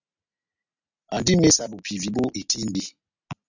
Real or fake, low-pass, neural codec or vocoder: real; 7.2 kHz; none